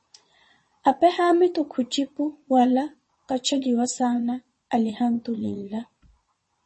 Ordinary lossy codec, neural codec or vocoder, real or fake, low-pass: MP3, 32 kbps; vocoder, 22.05 kHz, 80 mel bands, Vocos; fake; 9.9 kHz